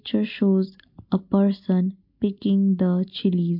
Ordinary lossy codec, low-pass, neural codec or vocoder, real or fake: MP3, 48 kbps; 5.4 kHz; none; real